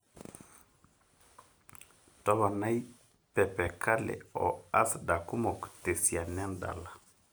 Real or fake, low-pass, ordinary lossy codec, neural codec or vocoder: real; none; none; none